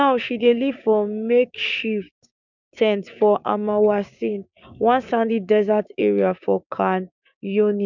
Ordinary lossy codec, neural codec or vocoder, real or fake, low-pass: none; codec, 16 kHz, 6 kbps, DAC; fake; 7.2 kHz